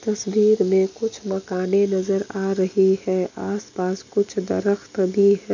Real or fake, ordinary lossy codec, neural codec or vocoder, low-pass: real; MP3, 64 kbps; none; 7.2 kHz